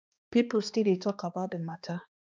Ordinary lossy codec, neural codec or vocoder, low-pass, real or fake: none; codec, 16 kHz, 2 kbps, X-Codec, HuBERT features, trained on balanced general audio; none; fake